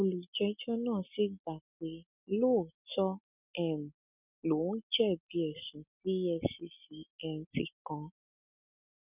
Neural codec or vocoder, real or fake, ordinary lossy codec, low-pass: none; real; none; 3.6 kHz